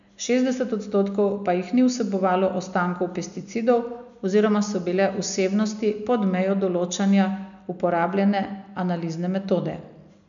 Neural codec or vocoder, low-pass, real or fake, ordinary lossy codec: none; 7.2 kHz; real; none